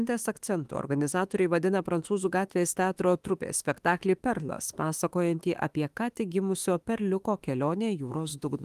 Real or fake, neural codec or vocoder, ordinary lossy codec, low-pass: fake; autoencoder, 48 kHz, 32 numbers a frame, DAC-VAE, trained on Japanese speech; Opus, 24 kbps; 14.4 kHz